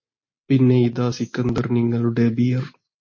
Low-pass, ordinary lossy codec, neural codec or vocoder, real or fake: 7.2 kHz; MP3, 32 kbps; none; real